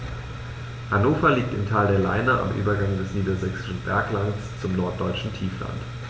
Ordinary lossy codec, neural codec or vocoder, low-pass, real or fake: none; none; none; real